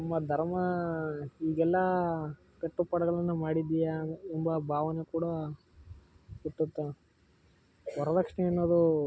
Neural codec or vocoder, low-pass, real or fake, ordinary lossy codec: none; none; real; none